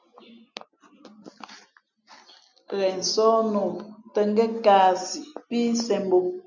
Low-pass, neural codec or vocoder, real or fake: 7.2 kHz; none; real